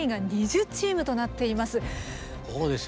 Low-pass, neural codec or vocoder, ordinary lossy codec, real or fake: none; none; none; real